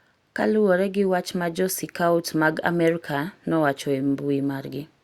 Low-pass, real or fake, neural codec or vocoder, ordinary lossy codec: 19.8 kHz; real; none; Opus, 64 kbps